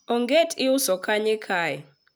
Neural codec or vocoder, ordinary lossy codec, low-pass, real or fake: none; none; none; real